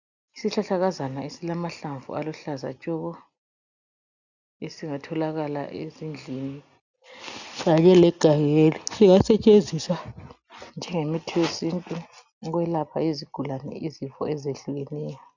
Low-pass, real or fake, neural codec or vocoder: 7.2 kHz; real; none